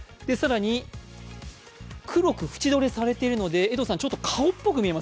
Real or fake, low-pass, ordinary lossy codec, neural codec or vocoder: real; none; none; none